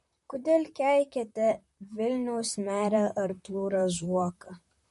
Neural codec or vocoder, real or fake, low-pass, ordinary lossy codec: vocoder, 44.1 kHz, 128 mel bands, Pupu-Vocoder; fake; 14.4 kHz; MP3, 48 kbps